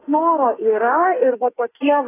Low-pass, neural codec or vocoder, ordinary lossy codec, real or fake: 3.6 kHz; codec, 44.1 kHz, 2.6 kbps, SNAC; AAC, 16 kbps; fake